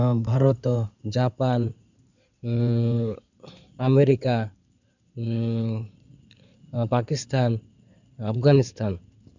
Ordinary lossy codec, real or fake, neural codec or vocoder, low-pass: none; fake; codec, 16 kHz in and 24 kHz out, 2.2 kbps, FireRedTTS-2 codec; 7.2 kHz